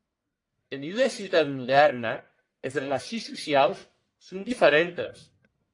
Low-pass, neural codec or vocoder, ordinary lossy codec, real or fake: 10.8 kHz; codec, 44.1 kHz, 1.7 kbps, Pupu-Codec; AAC, 48 kbps; fake